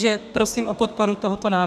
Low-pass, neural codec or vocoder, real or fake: 14.4 kHz; codec, 32 kHz, 1.9 kbps, SNAC; fake